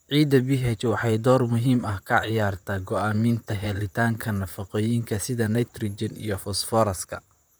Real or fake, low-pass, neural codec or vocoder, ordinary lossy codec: fake; none; vocoder, 44.1 kHz, 128 mel bands, Pupu-Vocoder; none